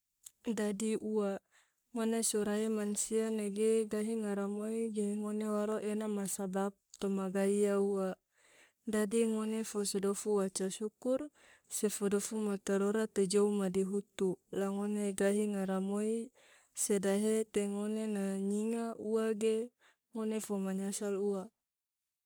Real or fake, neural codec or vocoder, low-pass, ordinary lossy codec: fake; codec, 44.1 kHz, 3.4 kbps, Pupu-Codec; none; none